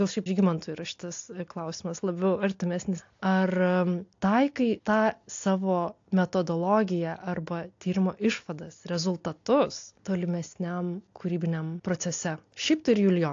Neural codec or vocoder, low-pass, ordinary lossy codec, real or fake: none; 7.2 kHz; AAC, 48 kbps; real